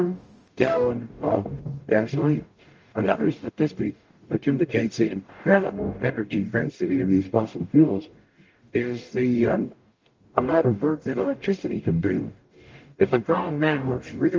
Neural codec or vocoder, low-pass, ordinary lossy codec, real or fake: codec, 44.1 kHz, 0.9 kbps, DAC; 7.2 kHz; Opus, 24 kbps; fake